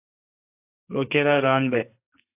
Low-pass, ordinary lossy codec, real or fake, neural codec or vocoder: 3.6 kHz; AAC, 24 kbps; fake; codec, 44.1 kHz, 2.6 kbps, SNAC